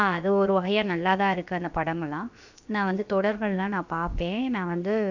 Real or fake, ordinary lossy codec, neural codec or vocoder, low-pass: fake; none; codec, 16 kHz, about 1 kbps, DyCAST, with the encoder's durations; 7.2 kHz